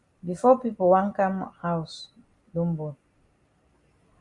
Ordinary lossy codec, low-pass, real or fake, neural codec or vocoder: Opus, 64 kbps; 10.8 kHz; real; none